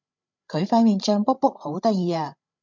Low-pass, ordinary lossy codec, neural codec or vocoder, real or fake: 7.2 kHz; MP3, 64 kbps; codec, 16 kHz, 8 kbps, FreqCodec, larger model; fake